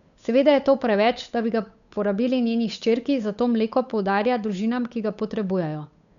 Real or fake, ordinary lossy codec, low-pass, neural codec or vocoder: fake; none; 7.2 kHz; codec, 16 kHz, 8 kbps, FunCodec, trained on Chinese and English, 25 frames a second